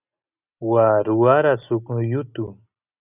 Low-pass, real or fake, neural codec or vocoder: 3.6 kHz; real; none